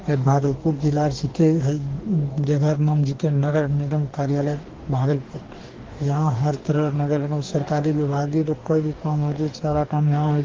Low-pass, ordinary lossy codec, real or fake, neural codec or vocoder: 7.2 kHz; Opus, 16 kbps; fake; codec, 44.1 kHz, 2.6 kbps, DAC